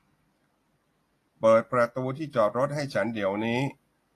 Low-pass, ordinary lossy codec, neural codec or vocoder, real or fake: 14.4 kHz; AAC, 48 kbps; none; real